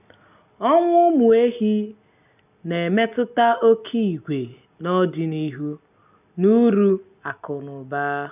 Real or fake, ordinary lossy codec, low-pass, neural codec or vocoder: real; none; 3.6 kHz; none